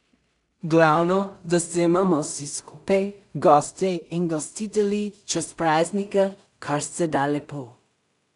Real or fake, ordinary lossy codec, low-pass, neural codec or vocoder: fake; none; 10.8 kHz; codec, 16 kHz in and 24 kHz out, 0.4 kbps, LongCat-Audio-Codec, two codebook decoder